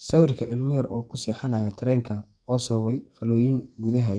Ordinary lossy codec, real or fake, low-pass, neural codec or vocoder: none; fake; 9.9 kHz; codec, 44.1 kHz, 2.6 kbps, SNAC